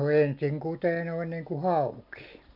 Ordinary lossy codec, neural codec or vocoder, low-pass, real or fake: none; none; 5.4 kHz; real